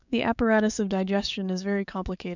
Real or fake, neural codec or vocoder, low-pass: fake; codec, 16 kHz, 6 kbps, DAC; 7.2 kHz